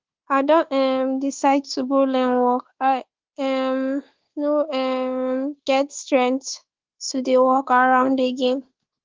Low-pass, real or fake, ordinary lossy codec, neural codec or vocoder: 7.2 kHz; fake; Opus, 16 kbps; codec, 24 kHz, 1.2 kbps, DualCodec